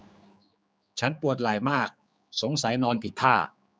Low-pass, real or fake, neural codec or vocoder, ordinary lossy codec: none; fake; codec, 16 kHz, 4 kbps, X-Codec, HuBERT features, trained on general audio; none